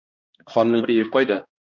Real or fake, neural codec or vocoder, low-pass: fake; codec, 16 kHz, 1 kbps, X-Codec, HuBERT features, trained on balanced general audio; 7.2 kHz